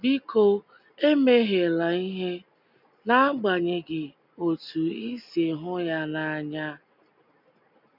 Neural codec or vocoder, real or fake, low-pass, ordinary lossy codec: none; real; 5.4 kHz; none